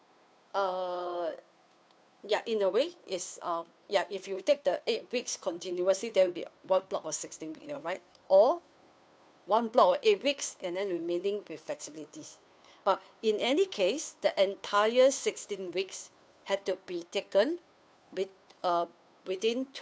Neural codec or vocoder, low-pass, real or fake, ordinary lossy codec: codec, 16 kHz, 2 kbps, FunCodec, trained on Chinese and English, 25 frames a second; none; fake; none